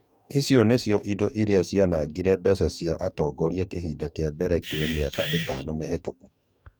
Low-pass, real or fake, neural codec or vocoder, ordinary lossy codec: none; fake; codec, 44.1 kHz, 2.6 kbps, DAC; none